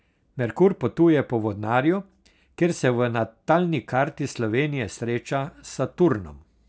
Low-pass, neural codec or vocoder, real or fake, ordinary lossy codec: none; none; real; none